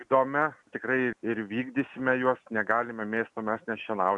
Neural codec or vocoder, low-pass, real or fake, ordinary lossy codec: none; 10.8 kHz; real; MP3, 96 kbps